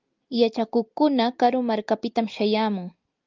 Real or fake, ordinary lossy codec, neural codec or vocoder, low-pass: real; Opus, 24 kbps; none; 7.2 kHz